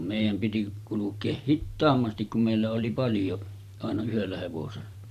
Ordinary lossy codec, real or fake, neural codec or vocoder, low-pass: none; fake; vocoder, 44.1 kHz, 128 mel bands every 512 samples, BigVGAN v2; 14.4 kHz